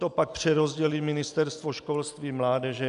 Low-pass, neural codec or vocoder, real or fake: 10.8 kHz; none; real